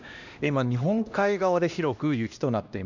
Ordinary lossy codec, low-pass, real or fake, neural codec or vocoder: none; 7.2 kHz; fake; codec, 16 kHz, 1 kbps, X-Codec, HuBERT features, trained on LibriSpeech